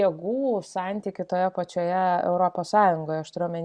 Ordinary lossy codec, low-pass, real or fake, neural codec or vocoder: Opus, 64 kbps; 9.9 kHz; real; none